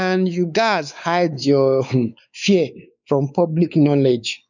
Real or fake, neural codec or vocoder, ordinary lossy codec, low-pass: fake; codec, 16 kHz, 4 kbps, X-Codec, WavLM features, trained on Multilingual LibriSpeech; none; 7.2 kHz